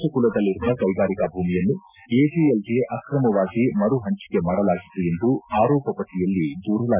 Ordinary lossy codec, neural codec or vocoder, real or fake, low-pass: none; none; real; 3.6 kHz